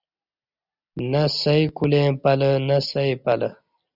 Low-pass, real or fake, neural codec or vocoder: 5.4 kHz; real; none